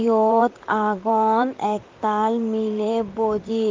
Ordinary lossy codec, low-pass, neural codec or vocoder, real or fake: Opus, 24 kbps; 7.2 kHz; vocoder, 44.1 kHz, 128 mel bands, Pupu-Vocoder; fake